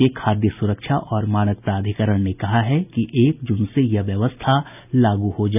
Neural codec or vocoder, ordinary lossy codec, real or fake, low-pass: none; none; real; 3.6 kHz